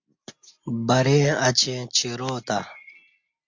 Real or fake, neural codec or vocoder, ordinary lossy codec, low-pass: real; none; MP3, 48 kbps; 7.2 kHz